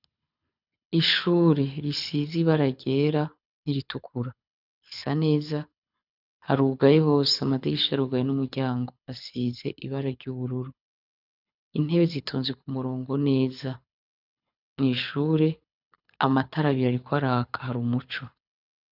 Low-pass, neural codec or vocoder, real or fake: 5.4 kHz; codec, 24 kHz, 6 kbps, HILCodec; fake